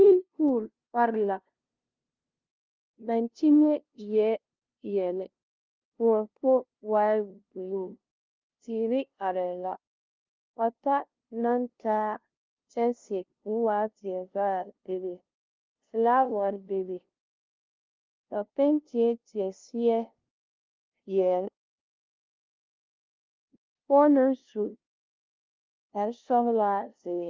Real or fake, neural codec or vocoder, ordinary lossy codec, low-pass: fake; codec, 16 kHz, 0.5 kbps, FunCodec, trained on LibriTTS, 25 frames a second; Opus, 32 kbps; 7.2 kHz